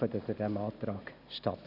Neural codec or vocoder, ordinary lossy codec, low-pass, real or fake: none; none; 5.4 kHz; real